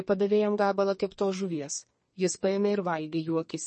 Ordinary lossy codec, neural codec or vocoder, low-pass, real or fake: MP3, 32 kbps; codec, 32 kHz, 1.9 kbps, SNAC; 10.8 kHz; fake